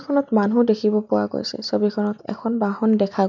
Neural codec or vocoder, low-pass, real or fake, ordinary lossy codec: none; 7.2 kHz; real; none